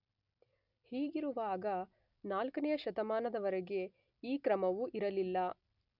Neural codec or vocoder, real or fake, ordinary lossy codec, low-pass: none; real; none; 5.4 kHz